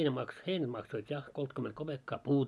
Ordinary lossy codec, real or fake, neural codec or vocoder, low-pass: none; real; none; none